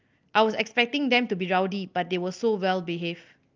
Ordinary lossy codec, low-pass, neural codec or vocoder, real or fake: Opus, 32 kbps; 7.2 kHz; none; real